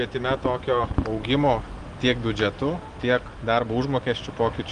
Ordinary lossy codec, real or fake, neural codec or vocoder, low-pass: Opus, 24 kbps; real; none; 9.9 kHz